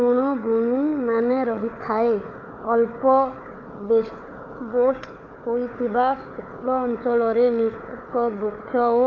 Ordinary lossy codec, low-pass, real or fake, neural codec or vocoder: none; 7.2 kHz; fake; codec, 16 kHz, 4 kbps, FunCodec, trained on Chinese and English, 50 frames a second